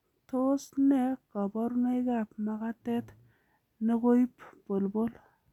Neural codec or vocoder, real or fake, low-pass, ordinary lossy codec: none; real; 19.8 kHz; none